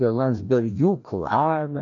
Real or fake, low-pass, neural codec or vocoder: fake; 7.2 kHz; codec, 16 kHz, 1 kbps, FreqCodec, larger model